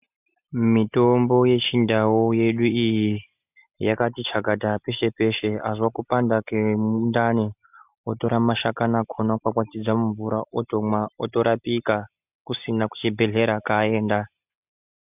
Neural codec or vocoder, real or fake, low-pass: none; real; 3.6 kHz